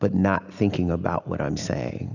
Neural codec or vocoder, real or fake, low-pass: none; real; 7.2 kHz